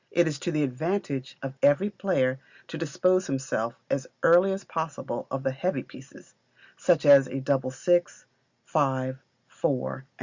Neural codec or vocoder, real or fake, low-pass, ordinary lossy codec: none; real; 7.2 kHz; Opus, 64 kbps